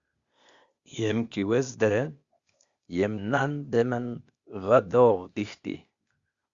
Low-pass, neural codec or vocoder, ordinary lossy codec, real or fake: 7.2 kHz; codec, 16 kHz, 0.8 kbps, ZipCodec; Opus, 64 kbps; fake